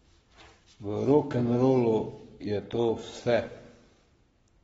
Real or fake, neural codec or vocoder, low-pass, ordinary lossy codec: fake; codec, 44.1 kHz, 7.8 kbps, Pupu-Codec; 19.8 kHz; AAC, 24 kbps